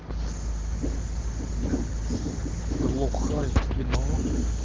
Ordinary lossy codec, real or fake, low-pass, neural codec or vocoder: Opus, 16 kbps; real; 7.2 kHz; none